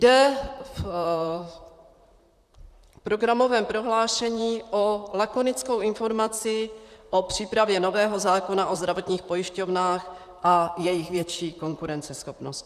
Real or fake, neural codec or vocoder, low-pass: fake; vocoder, 44.1 kHz, 128 mel bands, Pupu-Vocoder; 14.4 kHz